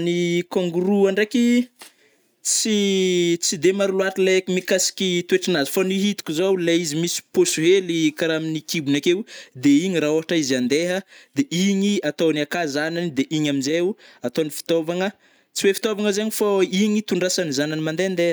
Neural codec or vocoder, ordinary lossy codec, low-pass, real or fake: none; none; none; real